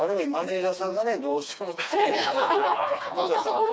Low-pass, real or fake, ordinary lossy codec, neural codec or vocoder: none; fake; none; codec, 16 kHz, 2 kbps, FreqCodec, smaller model